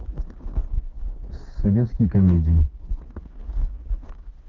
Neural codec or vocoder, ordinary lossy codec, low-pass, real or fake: codec, 16 kHz, 4 kbps, FreqCodec, smaller model; Opus, 16 kbps; 7.2 kHz; fake